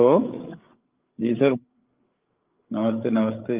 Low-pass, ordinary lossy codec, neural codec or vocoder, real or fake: 3.6 kHz; Opus, 32 kbps; codec, 16 kHz, 8 kbps, FreqCodec, larger model; fake